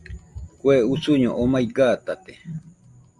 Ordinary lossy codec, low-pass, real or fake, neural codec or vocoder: Opus, 32 kbps; 10.8 kHz; real; none